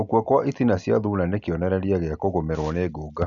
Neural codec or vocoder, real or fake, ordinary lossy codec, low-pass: none; real; none; 7.2 kHz